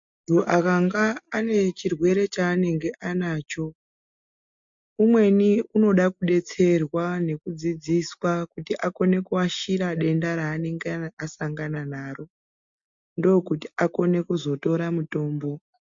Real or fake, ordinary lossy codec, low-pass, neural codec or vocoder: real; MP3, 48 kbps; 7.2 kHz; none